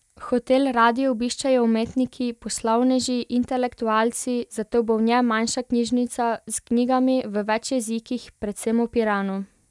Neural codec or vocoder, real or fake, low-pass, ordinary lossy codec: none; real; none; none